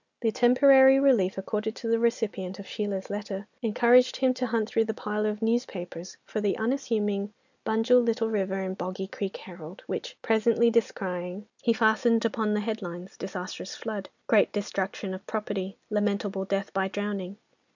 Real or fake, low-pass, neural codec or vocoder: real; 7.2 kHz; none